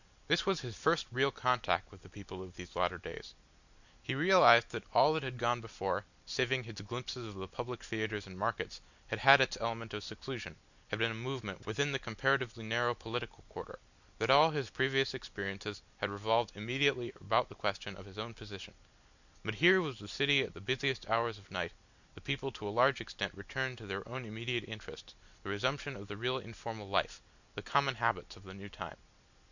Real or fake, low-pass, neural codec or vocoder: real; 7.2 kHz; none